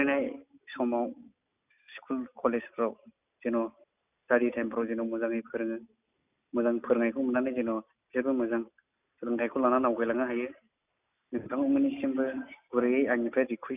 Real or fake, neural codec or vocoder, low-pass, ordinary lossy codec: real; none; 3.6 kHz; none